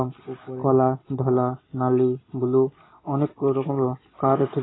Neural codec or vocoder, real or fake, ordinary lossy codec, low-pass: none; real; AAC, 16 kbps; 7.2 kHz